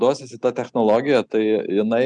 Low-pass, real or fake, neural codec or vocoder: 9.9 kHz; real; none